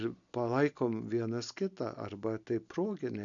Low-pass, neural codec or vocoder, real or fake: 7.2 kHz; none; real